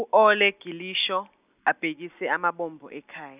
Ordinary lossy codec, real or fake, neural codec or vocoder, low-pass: none; real; none; 3.6 kHz